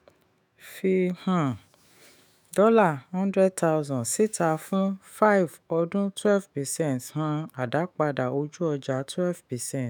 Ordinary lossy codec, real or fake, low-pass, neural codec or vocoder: none; fake; none; autoencoder, 48 kHz, 128 numbers a frame, DAC-VAE, trained on Japanese speech